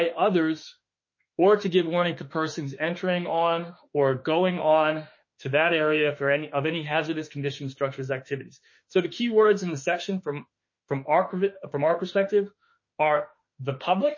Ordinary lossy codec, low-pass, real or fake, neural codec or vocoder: MP3, 32 kbps; 7.2 kHz; fake; autoencoder, 48 kHz, 32 numbers a frame, DAC-VAE, trained on Japanese speech